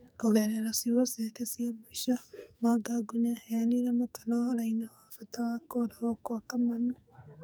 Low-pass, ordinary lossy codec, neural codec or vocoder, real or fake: none; none; codec, 44.1 kHz, 2.6 kbps, SNAC; fake